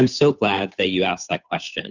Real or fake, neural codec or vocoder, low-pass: fake; codec, 16 kHz, 8 kbps, FunCodec, trained on Chinese and English, 25 frames a second; 7.2 kHz